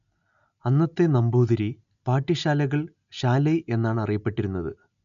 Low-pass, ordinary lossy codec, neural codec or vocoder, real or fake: 7.2 kHz; none; none; real